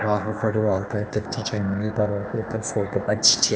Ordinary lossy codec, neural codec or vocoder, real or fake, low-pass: none; codec, 16 kHz, 0.8 kbps, ZipCodec; fake; none